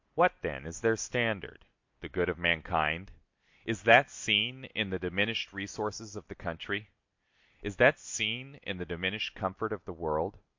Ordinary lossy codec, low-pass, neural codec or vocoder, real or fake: MP3, 48 kbps; 7.2 kHz; none; real